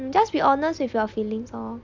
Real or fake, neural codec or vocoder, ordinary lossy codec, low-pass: real; none; MP3, 64 kbps; 7.2 kHz